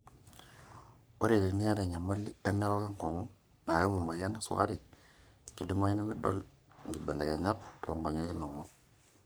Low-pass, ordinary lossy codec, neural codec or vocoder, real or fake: none; none; codec, 44.1 kHz, 3.4 kbps, Pupu-Codec; fake